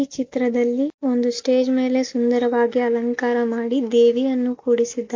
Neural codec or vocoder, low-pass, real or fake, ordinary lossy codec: none; 7.2 kHz; real; MP3, 48 kbps